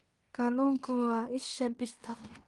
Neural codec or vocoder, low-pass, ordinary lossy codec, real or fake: codec, 16 kHz in and 24 kHz out, 0.9 kbps, LongCat-Audio-Codec, fine tuned four codebook decoder; 10.8 kHz; Opus, 24 kbps; fake